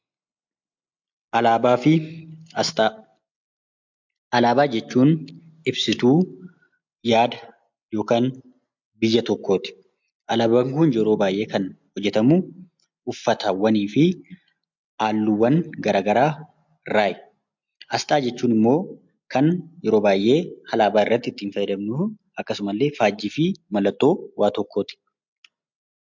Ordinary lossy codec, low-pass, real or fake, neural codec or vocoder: MP3, 64 kbps; 7.2 kHz; real; none